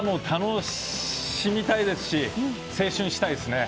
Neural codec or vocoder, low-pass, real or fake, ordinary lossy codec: none; none; real; none